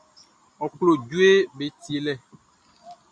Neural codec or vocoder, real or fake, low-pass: none; real; 9.9 kHz